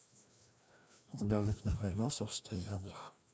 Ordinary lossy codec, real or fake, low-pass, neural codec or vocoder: none; fake; none; codec, 16 kHz, 1 kbps, FreqCodec, larger model